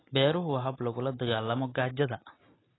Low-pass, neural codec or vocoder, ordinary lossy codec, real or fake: 7.2 kHz; none; AAC, 16 kbps; real